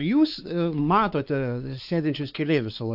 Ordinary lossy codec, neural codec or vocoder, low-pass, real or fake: AAC, 48 kbps; codec, 16 kHz, 4 kbps, X-Codec, WavLM features, trained on Multilingual LibriSpeech; 5.4 kHz; fake